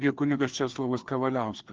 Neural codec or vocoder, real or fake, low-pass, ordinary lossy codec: codec, 16 kHz, 2 kbps, FreqCodec, larger model; fake; 7.2 kHz; Opus, 16 kbps